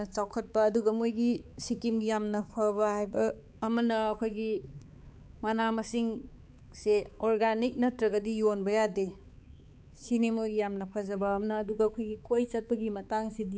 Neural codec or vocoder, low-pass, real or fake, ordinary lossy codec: codec, 16 kHz, 4 kbps, X-Codec, HuBERT features, trained on balanced general audio; none; fake; none